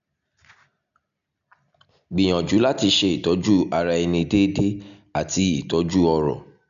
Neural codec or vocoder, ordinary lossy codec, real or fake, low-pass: none; none; real; 7.2 kHz